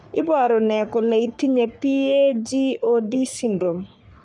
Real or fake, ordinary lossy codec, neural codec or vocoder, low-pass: fake; none; codec, 44.1 kHz, 3.4 kbps, Pupu-Codec; 10.8 kHz